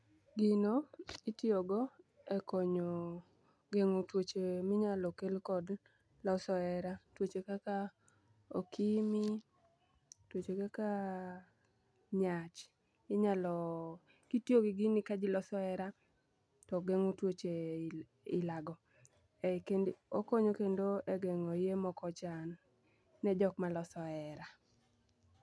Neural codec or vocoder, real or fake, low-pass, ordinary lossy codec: none; real; none; none